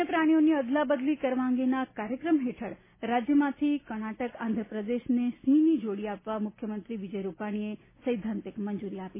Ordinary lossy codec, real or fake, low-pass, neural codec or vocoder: MP3, 16 kbps; real; 3.6 kHz; none